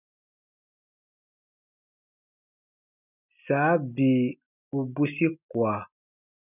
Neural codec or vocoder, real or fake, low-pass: none; real; 3.6 kHz